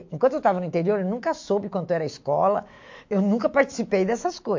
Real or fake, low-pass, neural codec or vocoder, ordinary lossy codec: fake; 7.2 kHz; autoencoder, 48 kHz, 128 numbers a frame, DAC-VAE, trained on Japanese speech; MP3, 48 kbps